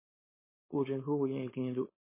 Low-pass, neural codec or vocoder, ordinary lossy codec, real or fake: 3.6 kHz; codec, 16 kHz, 4.8 kbps, FACodec; MP3, 16 kbps; fake